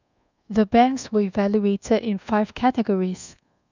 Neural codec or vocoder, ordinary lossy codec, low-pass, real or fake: codec, 24 kHz, 1.2 kbps, DualCodec; none; 7.2 kHz; fake